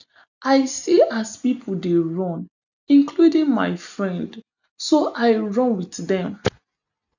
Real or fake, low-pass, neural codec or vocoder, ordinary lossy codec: real; 7.2 kHz; none; none